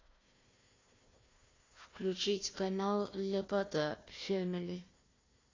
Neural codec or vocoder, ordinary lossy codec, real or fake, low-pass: codec, 16 kHz, 1 kbps, FunCodec, trained on Chinese and English, 50 frames a second; AAC, 32 kbps; fake; 7.2 kHz